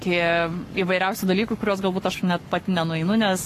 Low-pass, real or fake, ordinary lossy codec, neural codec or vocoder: 14.4 kHz; fake; AAC, 48 kbps; codec, 44.1 kHz, 7.8 kbps, Pupu-Codec